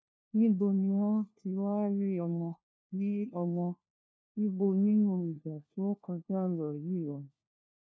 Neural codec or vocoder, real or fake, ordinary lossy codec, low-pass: codec, 16 kHz, 1 kbps, FunCodec, trained on LibriTTS, 50 frames a second; fake; none; none